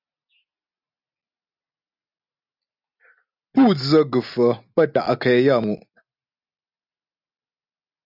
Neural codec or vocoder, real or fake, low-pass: none; real; 5.4 kHz